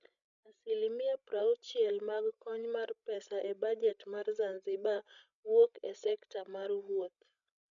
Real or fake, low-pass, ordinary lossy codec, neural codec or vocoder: fake; 7.2 kHz; none; codec, 16 kHz, 16 kbps, FreqCodec, larger model